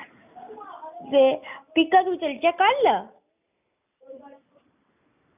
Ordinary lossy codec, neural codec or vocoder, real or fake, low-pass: none; none; real; 3.6 kHz